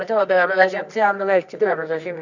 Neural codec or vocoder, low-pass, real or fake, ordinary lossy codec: codec, 24 kHz, 0.9 kbps, WavTokenizer, medium music audio release; 7.2 kHz; fake; none